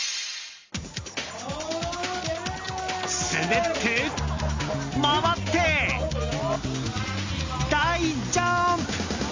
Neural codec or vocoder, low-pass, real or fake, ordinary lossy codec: none; 7.2 kHz; real; MP3, 64 kbps